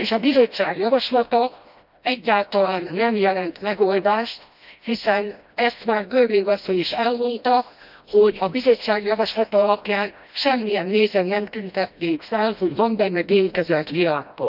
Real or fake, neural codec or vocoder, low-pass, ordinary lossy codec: fake; codec, 16 kHz, 1 kbps, FreqCodec, smaller model; 5.4 kHz; none